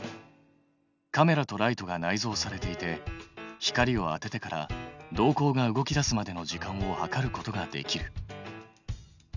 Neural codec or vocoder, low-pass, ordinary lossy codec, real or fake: none; 7.2 kHz; none; real